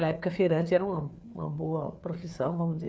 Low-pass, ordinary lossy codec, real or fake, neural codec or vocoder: none; none; fake; codec, 16 kHz, 4 kbps, FreqCodec, larger model